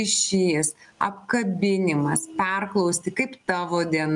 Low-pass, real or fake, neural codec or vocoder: 10.8 kHz; real; none